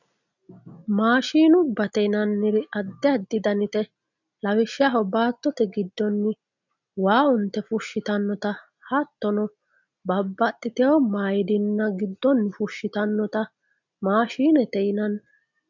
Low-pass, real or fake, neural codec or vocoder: 7.2 kHz; real; none